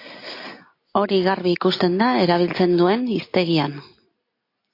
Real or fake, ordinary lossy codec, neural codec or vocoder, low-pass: real; AAC, 32 kbps; none; 5.4 kHz